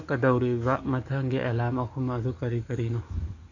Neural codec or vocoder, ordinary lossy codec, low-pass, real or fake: codec, 44.1 kHz, 7.8 kbps, DAC; none; 7.2 kHz; fake